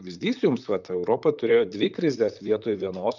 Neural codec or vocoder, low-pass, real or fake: vocoder, 44.1 kHz, 128 mel bands, Pupu-Vocoder; 7.2 kHz; fake